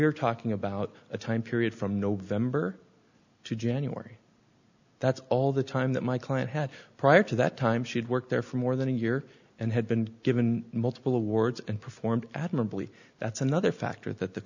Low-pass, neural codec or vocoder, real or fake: 7.2 kHz; none; real